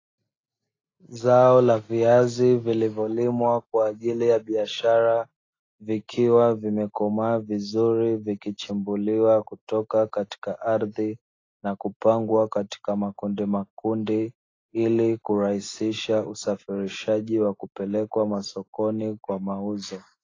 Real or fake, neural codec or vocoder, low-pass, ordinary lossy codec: real; none; 7.2 kHz; AAC, 32 kbps